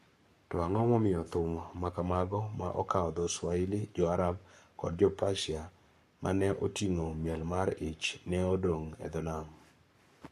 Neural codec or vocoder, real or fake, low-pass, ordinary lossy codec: codec, 44.1 kHz, 7.8 kbps, Pupu-Codec; fake; 14.4 kHz; MP3, 64 kbps